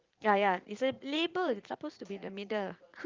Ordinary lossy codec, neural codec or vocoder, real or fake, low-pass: Opus, 32 kbps; vocoder, 44.1 kHz, 80 mel bands, Vocos; fake; 7.2 kHz